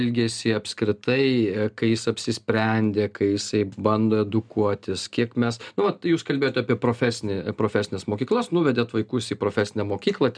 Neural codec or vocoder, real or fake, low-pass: none; real; 9.9 kHz